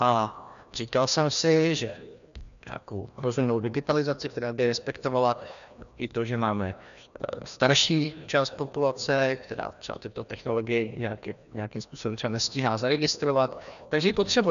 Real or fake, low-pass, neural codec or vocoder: fake; 7.2 kHz; codec, 16 kHz, 1 kbps, FreqCodec, larger model